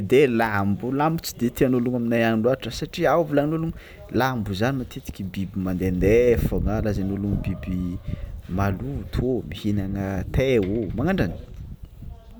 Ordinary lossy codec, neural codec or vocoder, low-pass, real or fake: none; none; none; real